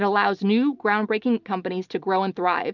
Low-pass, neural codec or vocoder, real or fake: 7.2 kHz; none; real